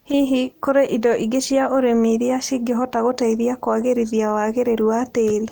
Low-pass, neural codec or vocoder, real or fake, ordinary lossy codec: 19.8 kHz; none; real; Opus, 24 kbps